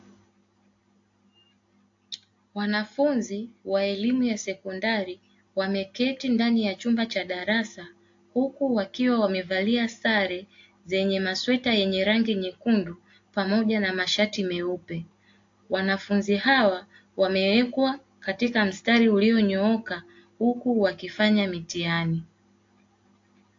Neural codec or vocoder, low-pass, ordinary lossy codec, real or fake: none; 7.2 kHz; AAC, 48 kbps; real